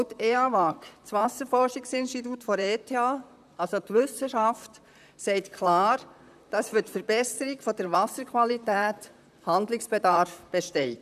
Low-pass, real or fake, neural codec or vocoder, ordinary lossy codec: 14.4 kHz; fake; vocoder, 44.1 kHz, 128 mel bands, Pupu-Vocoder; none